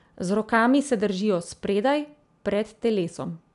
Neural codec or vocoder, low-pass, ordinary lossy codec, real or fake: none; 10.8 kHz; none; real